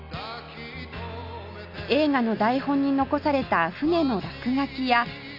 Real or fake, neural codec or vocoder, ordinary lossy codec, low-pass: real; none; none; 5.4 kHz